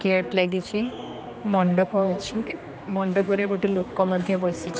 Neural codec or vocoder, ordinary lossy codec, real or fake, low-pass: codec, 16 kHz, 2 kbps, X-Codec, HuBERT features, trained on general audio; none; fake; none